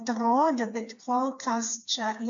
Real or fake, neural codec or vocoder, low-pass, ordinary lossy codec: fake; codec, 16 kHz, 4 kbps, FreqCodec, smaller model; 7.2 kHz; AAC, 48 kbps